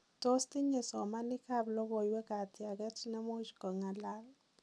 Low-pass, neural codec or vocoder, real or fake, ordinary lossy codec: none; none; real; none